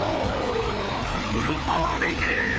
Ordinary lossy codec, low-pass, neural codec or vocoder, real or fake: none; none; codec, 16 kHz, 4 kbps, FreqCodec, larger model; fake